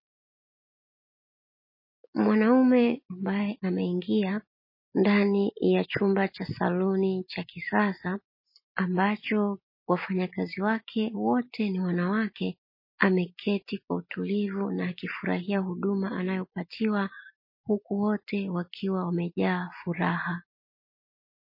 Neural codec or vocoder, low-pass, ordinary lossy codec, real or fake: none; 5.4 kHz; MP3, 24 kbps; real